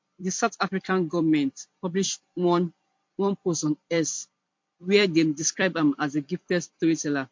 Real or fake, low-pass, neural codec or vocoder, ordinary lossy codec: fake; 7.2 kHz; vocoder, 44.1 kHz, 128 mel bands every 256 samples, BigVGAN v2; MP3, 48 kbps